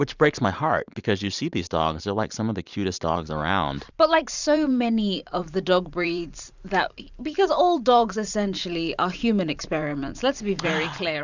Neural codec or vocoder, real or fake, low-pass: vocoder, 44.1 kHz, 128 mel bands every 512 samples, BigVGAN v2; fake; 7.2 kHz